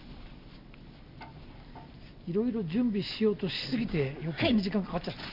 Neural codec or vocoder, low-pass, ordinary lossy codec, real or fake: none; 5.4 kHz; none; real